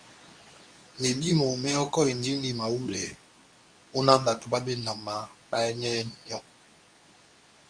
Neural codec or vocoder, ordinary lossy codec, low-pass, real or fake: codec, 24 kHz, 0.9 kbps, WavTokenizer, medium speech release version 2; Opus, 64 kbps; 9.9 kHz; fake